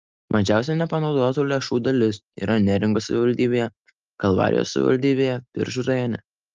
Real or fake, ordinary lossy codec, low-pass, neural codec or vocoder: real; Opus, 24 kbps; 7.2 kHz; none